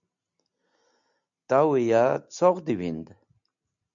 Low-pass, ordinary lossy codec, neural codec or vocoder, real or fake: 7.2 kHz; MP3, 96 kbps; none; real